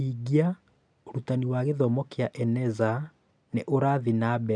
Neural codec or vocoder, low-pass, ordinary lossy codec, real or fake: none; 9.9 kHz; MP3, 96 kbps; real